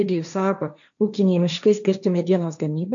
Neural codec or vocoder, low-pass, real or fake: codec, 16 kHz, 1.1 kbps, Voila-Tokenizer; 7.2 kHz; fake